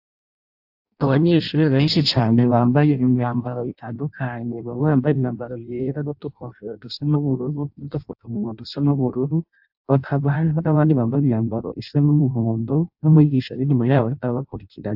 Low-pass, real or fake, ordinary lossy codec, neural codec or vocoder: 5.4 kHz; fake; AAC, 48 kbps; codec, 16 kHz in and 24 kHz out, 0.6 kbps, FireRedTTS-2 codec